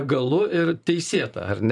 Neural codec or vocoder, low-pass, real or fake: vocoder, 44.1 kHz, 128 mel bands every 512 samples, BigVGAN v2; 10.8 kHz; fake